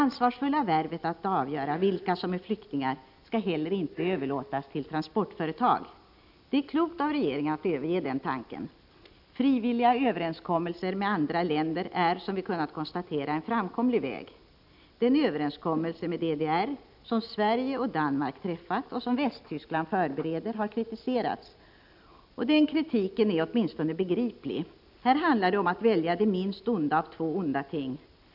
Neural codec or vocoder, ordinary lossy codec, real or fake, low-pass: none; none; real; 5.4 kHz